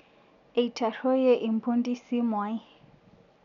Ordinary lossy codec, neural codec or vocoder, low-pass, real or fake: none; none; 7.2 kHz; real